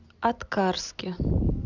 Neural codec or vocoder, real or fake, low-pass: none; real; 7.2 kHz